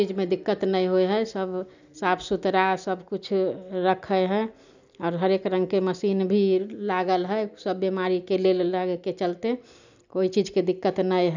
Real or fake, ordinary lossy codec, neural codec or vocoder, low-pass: real; none; none; 7.2 kHz